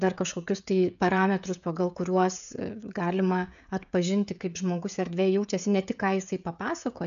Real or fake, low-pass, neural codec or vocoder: fake; 7.2 kHz; codec, 16 kHz, 16 kbps, FreqCodec, smaller model